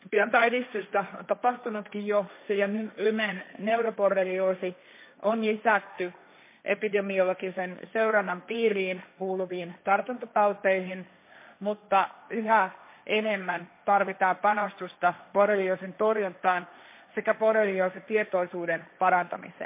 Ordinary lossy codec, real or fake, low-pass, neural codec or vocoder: MP3, 24 kbps; fake; 3.6 kHz; codec, 16 kHz, 1.1 kbps, Voila-Tokenizer